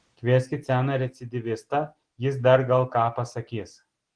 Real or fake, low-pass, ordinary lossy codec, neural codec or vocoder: real; 9.9 kHz; Opus, 16 kbps; none